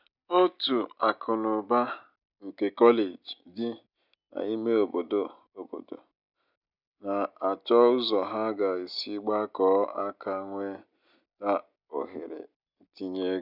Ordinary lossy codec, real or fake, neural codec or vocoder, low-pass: none; real; none; 5.4 kHz